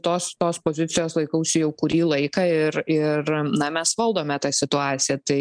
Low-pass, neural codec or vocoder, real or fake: 9.9 kHz; none; real